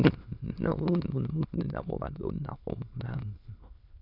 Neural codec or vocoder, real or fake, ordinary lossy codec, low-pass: autoencoder, 22.05 kHz, a latent of 192 numbers a frame, VITS, trained on many speakers; fake; MP3, 48 kbps; 5.4 kHz